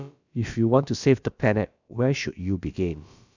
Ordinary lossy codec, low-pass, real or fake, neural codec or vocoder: none; 7.2 kHz; fake; codec, 16 kHz, about 1 kbps, DyCAST, with the encoder's durations